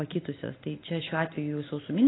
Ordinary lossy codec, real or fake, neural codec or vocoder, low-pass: AAC, 16 kbps; real; none; 7.2 kHz